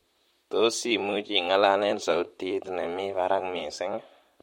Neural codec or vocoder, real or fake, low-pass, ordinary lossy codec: vocoder, 44.1 kHz, 128 mel bands, Pupu-Vocoder; fake; 19.8 kHz; MP3, 64 kbps